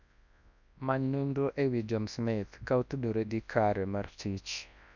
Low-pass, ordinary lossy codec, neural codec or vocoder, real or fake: 7.2 kHz; none; codec, 24 kHz, 0.9 kbps, WavTokenizer, large speech release; fake